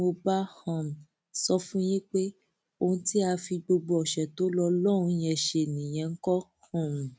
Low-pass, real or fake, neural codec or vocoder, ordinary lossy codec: none; real; none; none